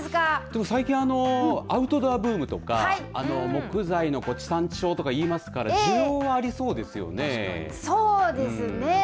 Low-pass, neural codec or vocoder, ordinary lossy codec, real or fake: none; none; none; real